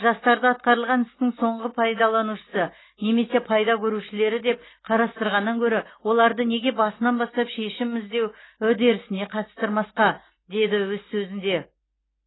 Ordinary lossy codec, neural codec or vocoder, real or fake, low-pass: AAC, 16 kbps; none; real; 7.2 kHz